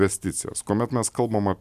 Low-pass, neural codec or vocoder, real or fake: 14.4 kHz; none; real